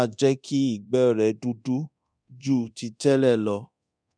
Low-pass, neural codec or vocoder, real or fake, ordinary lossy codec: 9.9 kHz; codec, 24 kHz, 0.9 kbps, DualCodec; fake; none